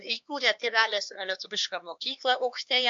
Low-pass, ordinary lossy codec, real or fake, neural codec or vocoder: 7.2 kHz; AAC, 64 kbps; fake; codec, 16 kHz, 2 kbps, X-Codec, HuBERT features, trained on LibriSpeech